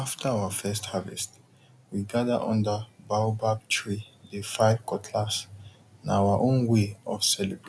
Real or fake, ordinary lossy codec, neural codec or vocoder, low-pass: real; none; none; none